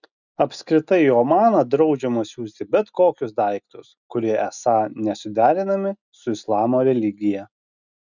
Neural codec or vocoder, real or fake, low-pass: none; real; 7.2 kHz